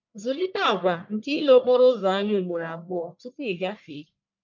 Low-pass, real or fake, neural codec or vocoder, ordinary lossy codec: 7.2 kHz; fake; codec, 44.1 kHz, 1.7 kbps, Pupu-Codec; none